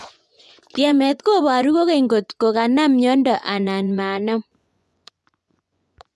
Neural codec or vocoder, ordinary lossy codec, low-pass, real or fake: vocoder, 24 kHz, 100 mel bands, Vocos; none; none; fake